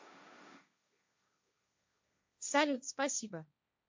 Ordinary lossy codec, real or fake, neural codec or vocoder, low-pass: MP3, 64 kbps; fake; codec, 16 kHz, 1.1 kbps, Voila-Tokenizer; 7.2 kHz